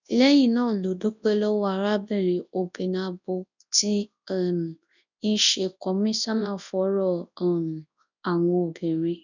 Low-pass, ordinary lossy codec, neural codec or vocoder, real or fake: 7.2 kHz; none; codec, 24 kHz, 0.9 kbps, WavTokenizer, large speech release; fake